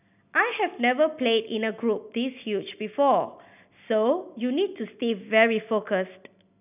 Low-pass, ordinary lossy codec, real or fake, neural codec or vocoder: 3.6 kHz; none; real; none